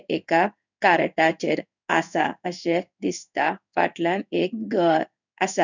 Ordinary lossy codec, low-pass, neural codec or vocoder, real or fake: none; 7.2 kHz; codec, 16 kHz in and 24 kHz out, 1 kbps, XY-Tokenizer; fake